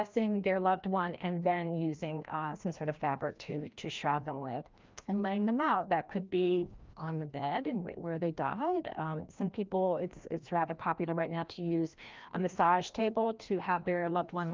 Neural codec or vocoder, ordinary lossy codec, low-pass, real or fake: codec, 16 kHz, 1 kbps, FreqCodec, larger model; Opus, 24 kbps; 7.2 kHz; fake